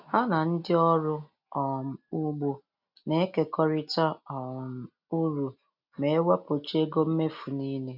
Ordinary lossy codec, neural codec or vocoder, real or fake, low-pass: none; none; real; 5.4 kHz